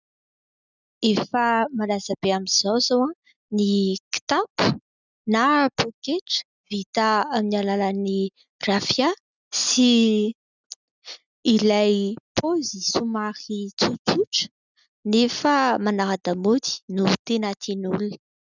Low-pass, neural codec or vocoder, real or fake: 7.2 kHz; none; real